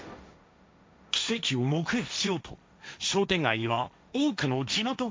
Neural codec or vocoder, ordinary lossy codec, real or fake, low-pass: codec, 16 kHz, 1.1 kbps, Voila-Tokenizer; none; fake; none